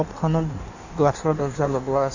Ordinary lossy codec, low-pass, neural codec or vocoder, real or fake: none; 7.2 kHz; codec, 16 kHz in and 24 kHz out, 1.1 kbps, FireRedTTS-2 codec; fake